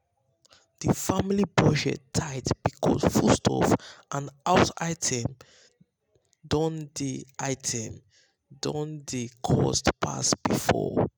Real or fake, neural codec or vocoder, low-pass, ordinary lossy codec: real; none; none; none